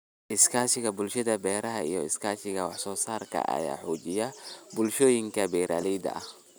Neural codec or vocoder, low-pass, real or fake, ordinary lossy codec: none; none; real; none